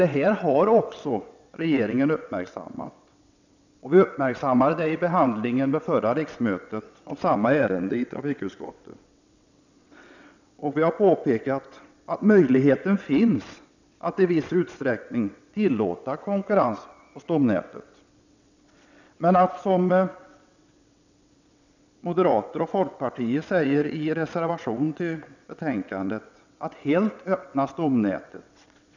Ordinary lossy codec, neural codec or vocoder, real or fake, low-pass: none; vocoder, 22.05 kHz, 80 mel bands, WaveNeXt; fake; 7.2 kHz